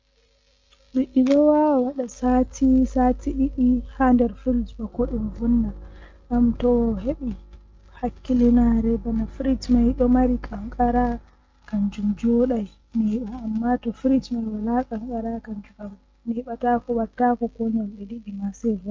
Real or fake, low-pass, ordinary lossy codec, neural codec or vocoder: real; 7.2 kHz; Opus, 32 kbps; none